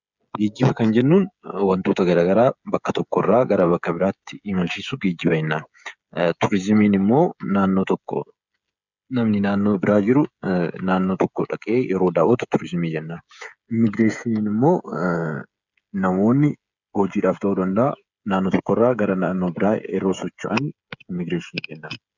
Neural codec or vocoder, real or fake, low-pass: codec, 16 kHz, 16 kbps, FreqCodec, smaller model; fake; 7.2 kHz